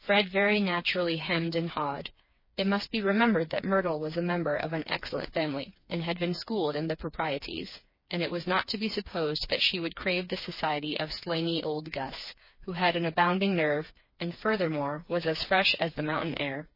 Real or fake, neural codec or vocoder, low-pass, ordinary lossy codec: fake; codec, 16 kHz, 4 kbps, FreqCodec, smaller model; 5.4 kHz; MP3, 24 kbps